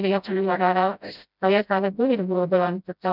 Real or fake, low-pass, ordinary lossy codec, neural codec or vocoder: fake; 5.4 kHz; none; codec, 16 kHz, 0.5 kbps, FreqCodec, smaller model